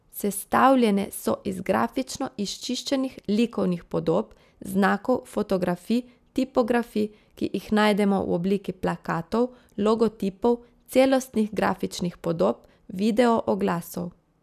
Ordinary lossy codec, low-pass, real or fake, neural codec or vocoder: none; 14.4 kHz; real; none